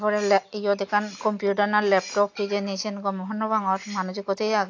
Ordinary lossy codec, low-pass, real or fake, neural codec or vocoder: AAC, 48 kbps; 7.2 kHz; real; none